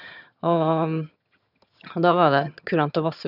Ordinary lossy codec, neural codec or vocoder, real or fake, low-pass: none; vocoder, 22.05 kHz, 80 mel bands, HiFi-GAN; fake; 5.4 kHz